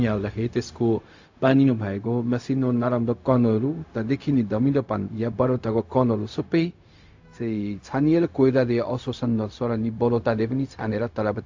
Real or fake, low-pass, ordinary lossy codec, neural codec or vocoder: fake; 7.2 kHz; AAC, 48 kbps; codec, 16 kHz, 0.4 kbps, LongCat-Audio-Codec